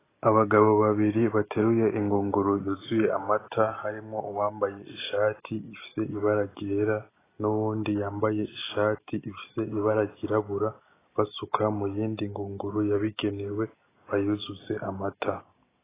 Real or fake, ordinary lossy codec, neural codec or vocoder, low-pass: real; AAC, 16 kbps; none; 3.6 kHz